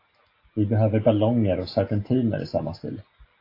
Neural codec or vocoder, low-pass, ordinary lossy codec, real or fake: none; 5.4 kHz; AAC, 32 kbps; real